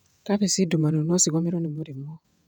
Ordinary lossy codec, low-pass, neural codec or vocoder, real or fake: none; 19.8 kHz; vocoder, 48 kHz, 128 mel bands, Vocos; fake